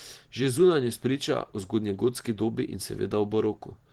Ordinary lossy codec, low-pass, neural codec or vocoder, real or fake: Opus, 16 kbps; 19.8 kHz; vocoder, 44.1 kHz, 128 mel bands every 512 samples, BigVGAN v2; fake